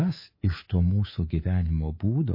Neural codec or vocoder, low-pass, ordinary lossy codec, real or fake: codec, 24 kHz, 3.1 kbps, DualCodec; 5.4 kHz; MP3, 24 kbps; fake